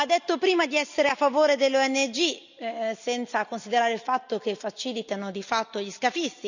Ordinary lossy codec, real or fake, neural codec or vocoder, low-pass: none; real; none; 7.2 kHz